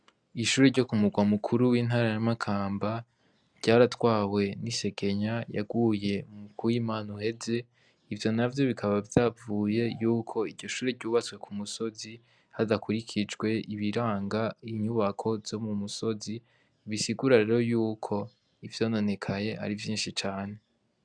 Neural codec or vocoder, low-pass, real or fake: none; 9.9 kHz; real